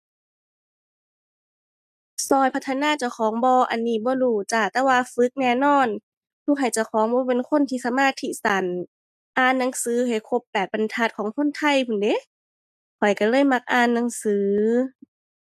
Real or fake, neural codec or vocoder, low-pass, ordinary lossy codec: real; none; 14.4 kHz; none